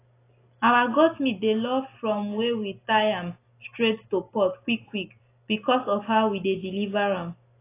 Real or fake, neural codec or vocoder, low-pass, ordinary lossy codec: real; none; 3.6 kHz; AAC, 24 kbps